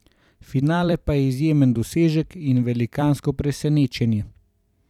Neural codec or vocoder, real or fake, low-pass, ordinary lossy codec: vocoder, 44.1 kHz, 128 mel bands every 256 samples, BigVGAN v2; fake; 19.8 kHz; none